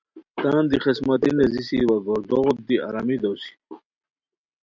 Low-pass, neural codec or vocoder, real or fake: 7.2 kHz; none; real